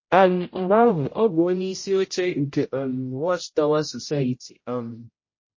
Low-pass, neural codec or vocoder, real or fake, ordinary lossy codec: 7.2 kHz; codec, 16 kHz, 0.5 kbps, X-Codec, HuBERT features, trained on general audio; fake; MP3, 32 kbps